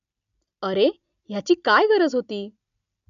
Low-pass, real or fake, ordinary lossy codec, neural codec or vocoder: 7.2 kHz; real; none; none